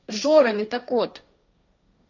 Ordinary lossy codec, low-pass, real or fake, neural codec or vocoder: none; 7.2 kHz; fake; codec, 16 kHz, 1.1 kbps, Voila-Tokenizer